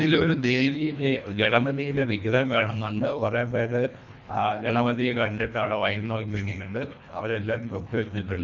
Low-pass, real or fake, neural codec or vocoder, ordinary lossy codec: 7.2 kHz; fake; codec, 24 kHz, 1.5 kbps, HILCodec; none